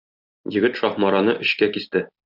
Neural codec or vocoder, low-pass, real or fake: none; 5.4 kHz; real